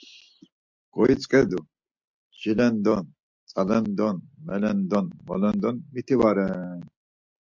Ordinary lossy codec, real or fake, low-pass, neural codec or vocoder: MP3, 64 kbps; real; 7.2 kHz; none